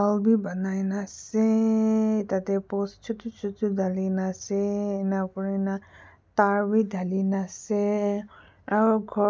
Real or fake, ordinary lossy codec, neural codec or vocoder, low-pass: real; none; none; none